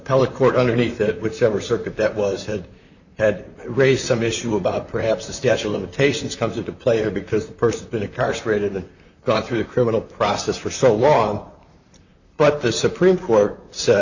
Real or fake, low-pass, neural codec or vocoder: fake; 7.2 kHz; vocoder, 44.1 kHz, 128 mel bands, Pupu-Vocoder